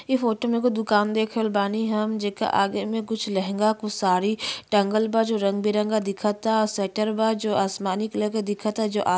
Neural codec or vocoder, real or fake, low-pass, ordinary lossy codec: none; real; none; none